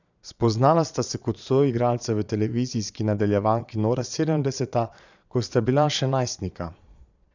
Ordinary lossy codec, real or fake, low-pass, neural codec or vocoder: none; fake; 7.2 kHz; vocoder, 22.05 kHz, 80 mel bands, Vocos